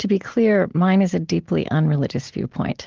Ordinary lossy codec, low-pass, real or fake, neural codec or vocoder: Opus, 16 kbps; 7.2 kHz; real; none